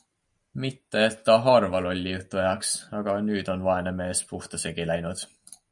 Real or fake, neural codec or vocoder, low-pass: real; none; 10.8 kHz